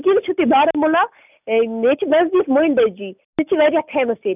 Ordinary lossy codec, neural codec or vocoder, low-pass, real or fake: none; none; 3.6 kHz; real